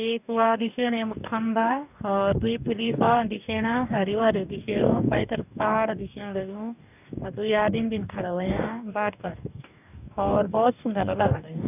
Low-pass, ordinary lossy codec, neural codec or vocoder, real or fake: 3.6 kHz; none; codec, 44.1 kHz, 2.6 kbps, DAC; fake